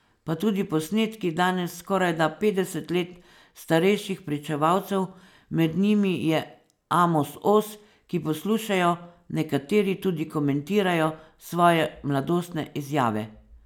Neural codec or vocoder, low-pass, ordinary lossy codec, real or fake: none; 19.8 kHz; none; real